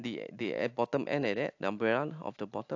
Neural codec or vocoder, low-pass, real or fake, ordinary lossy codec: none; 7.2 kHz; real; AAC, 48 kbps